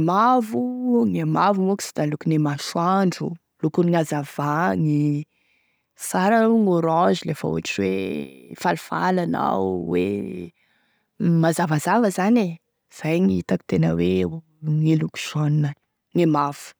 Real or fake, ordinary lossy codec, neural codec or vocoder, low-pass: real; none; none; none